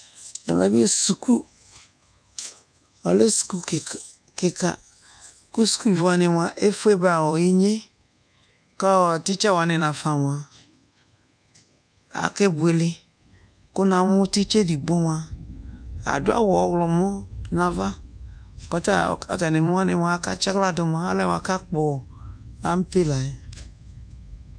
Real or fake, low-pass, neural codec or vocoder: fake; 9.9 kHz; codec, 24 kHz, 1.2 kbps, DualCodec